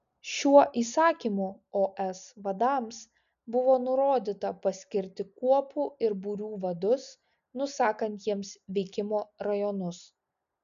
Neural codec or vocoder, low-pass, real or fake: none; 7.2 kHz; real